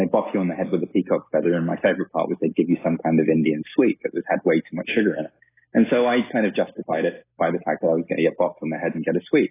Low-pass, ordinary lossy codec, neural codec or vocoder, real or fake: 3.6 kHz; AAC, 16 kbps; none; real